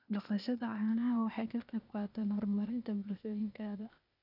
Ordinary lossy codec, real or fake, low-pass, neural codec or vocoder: none; fake; 5.4 kHz; codec, 16 kHz, 0.8 kbps, ZipCodec